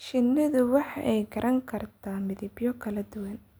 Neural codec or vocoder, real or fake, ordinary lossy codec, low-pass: none; real; none; none